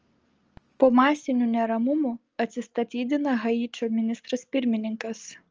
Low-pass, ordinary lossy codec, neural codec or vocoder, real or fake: 7.2 kHz; Opus, 24 kbps; none; real